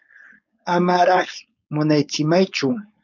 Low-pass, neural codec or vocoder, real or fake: 7.2 kHz; codec, 16 kHz, 4.8 kbps, FACodec; fake